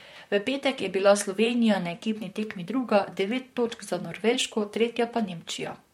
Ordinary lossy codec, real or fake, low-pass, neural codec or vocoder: MP3, 64 kbps; fake; 19.8 kHz; vocoder, 44.1 kHz, 128 mel bands, Pupu-Vocoder